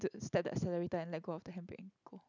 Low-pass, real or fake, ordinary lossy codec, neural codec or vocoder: 7.2 kHz; real; none; none